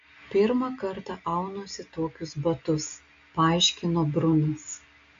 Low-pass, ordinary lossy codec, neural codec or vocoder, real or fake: 7.2 kHz; AAC, 64 kbps; none; real